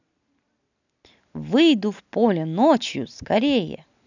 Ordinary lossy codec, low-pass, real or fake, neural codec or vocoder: none; 7.2 kHz; real; none